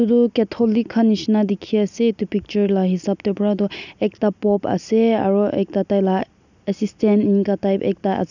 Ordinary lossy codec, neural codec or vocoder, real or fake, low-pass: none; none; real; 7.2 kHz